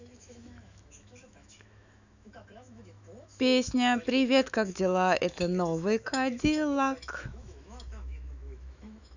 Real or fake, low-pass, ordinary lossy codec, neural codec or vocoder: fake; 7.2 kHz; none; autoencoder, 48 kHz, 128 numbers a frame, DAC-VAE, trained on Japanese speech